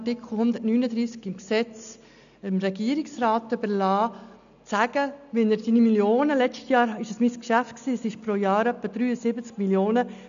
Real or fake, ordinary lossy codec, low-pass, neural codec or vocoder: real; none; 7.2 kHz; none